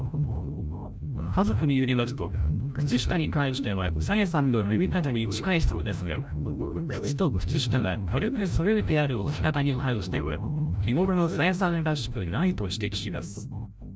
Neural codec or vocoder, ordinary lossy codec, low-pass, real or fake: codec, 16 kHz, 0.5 kbps, FreqCodec, larger model; none; none; fake